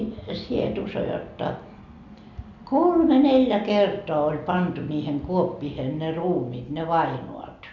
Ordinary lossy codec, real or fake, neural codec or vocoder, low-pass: none; real; none; 7.2 kHz